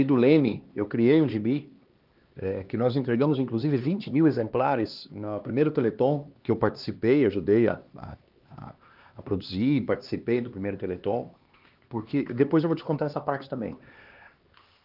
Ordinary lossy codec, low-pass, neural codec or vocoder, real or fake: Opus, 24 kbps; 5.4 kHz; codec, 16 kHz, 2 kbps, X-Codec, HuBERT features, trained on LibriSpeech; fake